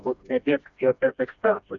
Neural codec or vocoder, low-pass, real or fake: codec, 16 kHz, 1 kbps, FreqCodec, smaller model; 7.2 kHz; fake